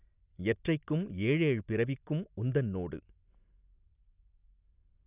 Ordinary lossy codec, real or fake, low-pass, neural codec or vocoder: none; real; 3.6 kHz; none